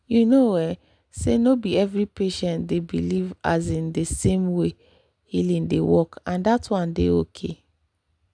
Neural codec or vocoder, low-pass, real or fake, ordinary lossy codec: none; 9.9 kHz; real; none